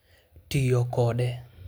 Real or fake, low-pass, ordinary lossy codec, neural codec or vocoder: real; none; none; none